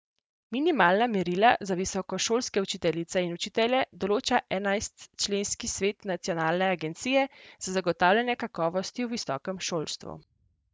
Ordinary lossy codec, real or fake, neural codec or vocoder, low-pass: none; real; none; none